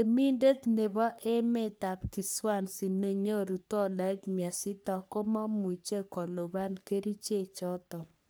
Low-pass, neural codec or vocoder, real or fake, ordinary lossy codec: none; codec, 44.1 kHz, 3.4 kbps, Pupu-Codec; fake; none